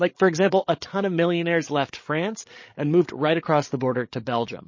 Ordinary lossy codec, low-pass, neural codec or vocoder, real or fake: MP3, 32 kbps; 7.2 kHz; codec, 16 kHz, 16 kbps, FreqCodec, larger model; fake